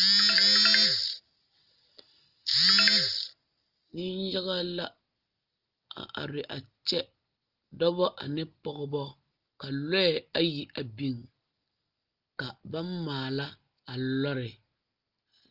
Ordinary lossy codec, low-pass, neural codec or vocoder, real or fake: Opus, 24 kbps; 5.4 kHz; none; real